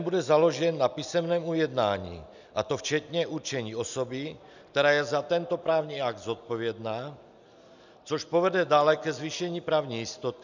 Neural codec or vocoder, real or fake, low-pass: none; real; 7.2 kHz